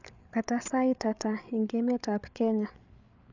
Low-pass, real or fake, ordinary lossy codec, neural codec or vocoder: 7.2 kHz; fake; none; codec, 16 kHz, 8 kbps, FreqCodec, larger model